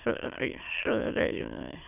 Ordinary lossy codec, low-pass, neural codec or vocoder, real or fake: none; 3.6 kHz; autoencoder, 22.05 kHz, a latent of 192 numbers a frame, VITS, trained on many speakers; fake